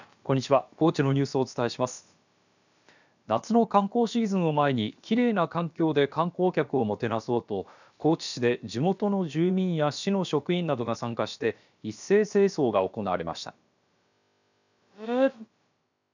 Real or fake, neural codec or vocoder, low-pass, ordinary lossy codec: fake; codec, 16 kHz, about 1 kbps, DyCAST, with the encoder's durations; 7.2 kHz; none